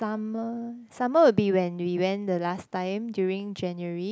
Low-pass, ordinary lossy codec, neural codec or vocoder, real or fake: none; none; none; real